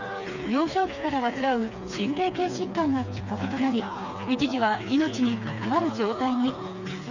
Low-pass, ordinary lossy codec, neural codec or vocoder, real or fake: 7.2 kHz; none; codec, 16 kHz, 4 kbps, FreqCodec, smaller model; fake